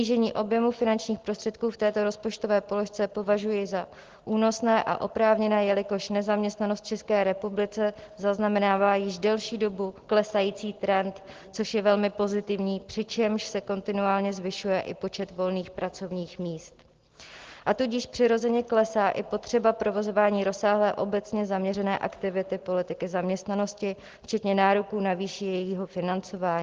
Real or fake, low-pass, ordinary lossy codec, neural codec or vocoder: real; 7.2 kHz; Opus, 16 kbps; none